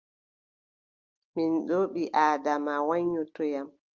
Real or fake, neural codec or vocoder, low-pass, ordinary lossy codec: real; none; 7.2 kHz; Opus, 24 kbps